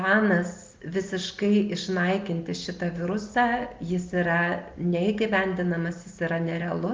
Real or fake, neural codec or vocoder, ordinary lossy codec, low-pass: real; none; Opus, 32 kbps; 7.2 kHz